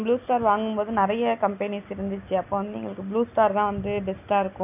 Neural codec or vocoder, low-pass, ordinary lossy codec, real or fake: none; 3.6 kHz; none; real